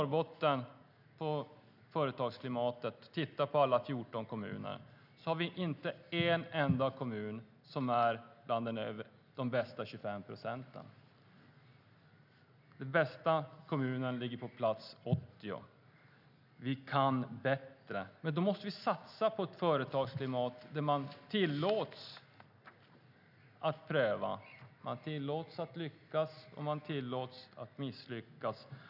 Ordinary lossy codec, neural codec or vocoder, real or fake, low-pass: none; none; real; 5.4 kHz